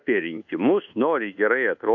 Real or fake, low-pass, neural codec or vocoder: fake; 7.2 kHz; codec, 24 kHz, 1.2 kbps, DualCodec